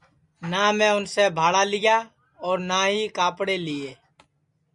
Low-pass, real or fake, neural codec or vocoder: 10.8 kHz; real; none